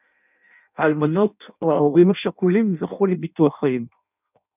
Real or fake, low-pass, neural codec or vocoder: fake; 3.6 kHz; codec, 16 kHz in and 24 kHz out, 0.6 kbps, FireRedTTS-2 codec